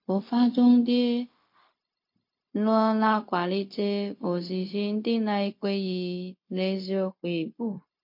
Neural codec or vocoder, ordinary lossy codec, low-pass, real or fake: codec, 16 kHz, 0.4 kbps, LongCat-Audio-Codec; MP3, 32 kbps; 5.4 kHz; fake